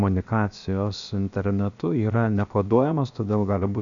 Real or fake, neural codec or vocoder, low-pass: fake; codec, 16 kHz, 0.7 kbps, FocalCodec; 7.2 kHz